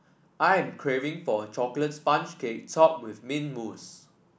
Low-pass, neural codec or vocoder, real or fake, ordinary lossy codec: none; none; real; none